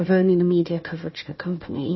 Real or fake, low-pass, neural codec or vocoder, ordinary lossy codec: fake; 7.2 kHz; codec, 16 kHz, 1 kbps, FunCodec, trained on Chinese and English, 50 frames a second; MP3, 24 kbps